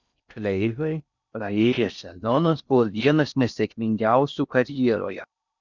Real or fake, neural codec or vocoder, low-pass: fake; codec, 16 kHz in and 24 kHz out, 0.6 kbps, FocalCodec, streaming, 4096 codes; 7.2 kHz